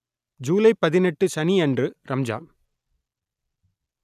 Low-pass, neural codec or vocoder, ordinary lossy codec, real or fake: 14.4 kHz; none; none; real